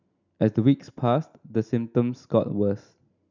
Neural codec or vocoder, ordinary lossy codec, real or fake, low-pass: none; none; real; 7.2 kHz